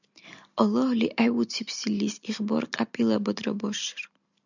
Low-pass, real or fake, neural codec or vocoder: 7.2 kHz; real; none